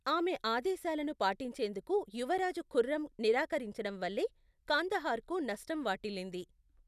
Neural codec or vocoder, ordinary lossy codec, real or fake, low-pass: none; none; real; 14.4 kHz